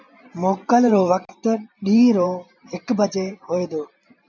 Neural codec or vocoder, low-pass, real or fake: vocoder, 44.1 kHz, 128 mel bands every 512 samples, BigVGAN v2; 7.2 kHz; fake